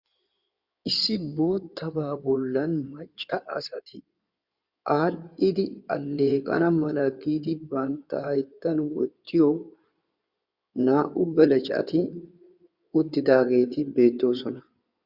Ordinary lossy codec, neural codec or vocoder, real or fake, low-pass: Opus, 64 kbps; codec, 16 kHz in and 24 kHz out, 2.2 kbps, FireRedTTS-2 codec; fake; 5.4 kHz